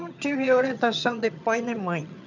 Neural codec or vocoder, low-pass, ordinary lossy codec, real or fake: vocoder, 22.05 kHz, 80 mel bands, HiFi-GAN; 7.2 kHz; none; fake